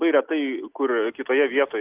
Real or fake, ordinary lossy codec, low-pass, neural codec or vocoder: real; Opus, 24 kbps; 3.6 kHz; none